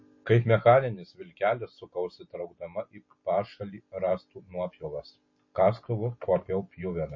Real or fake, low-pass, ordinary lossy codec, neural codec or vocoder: real; 7.2 kHz; MP3, 32 kbps; none